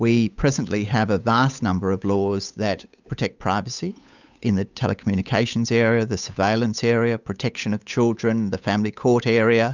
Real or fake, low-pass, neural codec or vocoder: fake; 7.2 kHz; codec, 16 kHz, 8 kbps, FunCodec, trained on Chinese and English, 25 frames a second